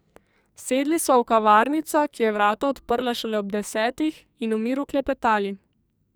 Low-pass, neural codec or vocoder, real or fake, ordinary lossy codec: none; codec, 44.1 kHz, 2.6 kbps, SNAC; fake; none